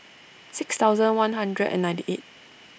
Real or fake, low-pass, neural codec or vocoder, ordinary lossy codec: real; none; none; none